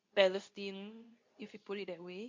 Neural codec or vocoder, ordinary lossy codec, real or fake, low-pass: codec, 24 kHz, 0.9 kbps, WavTokenizer, medium speech release version 2; MP3, 48 kbps; fake; 7.2 kHz